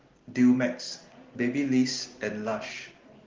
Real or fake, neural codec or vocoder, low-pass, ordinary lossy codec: real; none; 7.2 kHz; Opus, 16 kbps